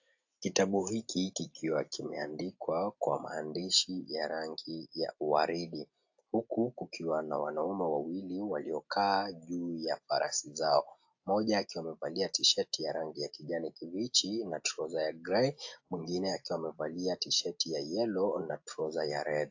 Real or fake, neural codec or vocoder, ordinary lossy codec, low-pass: real; none; AAC, 48 kbps; 7.2 kHz